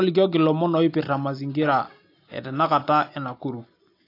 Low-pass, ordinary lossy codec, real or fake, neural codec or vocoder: 5.4 kHz; AAC, 32 kbps; real; none